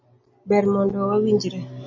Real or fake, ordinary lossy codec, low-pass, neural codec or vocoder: real; MP3, 32 kbps; 7.2 kHz; none